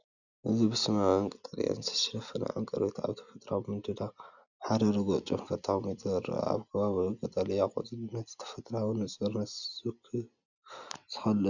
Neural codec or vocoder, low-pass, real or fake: none; 7.2 kHz; real